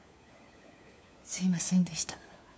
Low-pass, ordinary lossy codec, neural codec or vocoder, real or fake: none; none; codec, 16 kHz, 4 kbps, FunCodec, trained on LibriTTS, 50 frames a second; fake